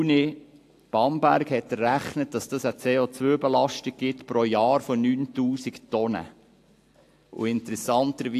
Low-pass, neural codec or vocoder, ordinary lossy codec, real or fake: 14.4 kHz; none; AAC, 64 kbps; real